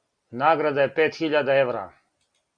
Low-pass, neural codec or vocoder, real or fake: 9.9 kHz; none; real